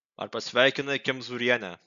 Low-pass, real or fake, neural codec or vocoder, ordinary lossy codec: 7.2 kHz; real; none; AAC, 96 kbps